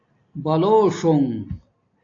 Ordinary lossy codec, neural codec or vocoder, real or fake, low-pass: AAC, 48 kbps; none; real; 7.2 kHz